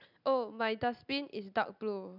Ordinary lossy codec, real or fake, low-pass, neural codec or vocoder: none; real; 5.4 kHz; none